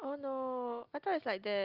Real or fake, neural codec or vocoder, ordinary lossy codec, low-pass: real; none; Opus, 24 kbps; 5.4 kHz